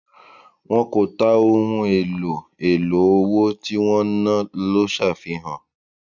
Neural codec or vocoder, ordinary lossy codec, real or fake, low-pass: none; none; real; 7.2 kHz